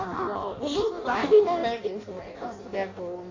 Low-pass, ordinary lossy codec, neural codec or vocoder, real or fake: 7.2 kHz; AAC, 32 kbps; codec, 16 kHz in and 24 kHz out, 0.6 kbps, FireRedTTS-2 codec; fake